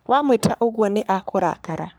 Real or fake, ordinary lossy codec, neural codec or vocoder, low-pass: fake; none; codec, 44.1 kHz, 3.4 kbps, Pupu-Codec; none